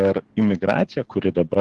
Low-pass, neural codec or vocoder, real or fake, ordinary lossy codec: 10.8 kHz; codec, 44.1 kHz, 7.8 kbps, DAC; fake; Opus, 16 kbps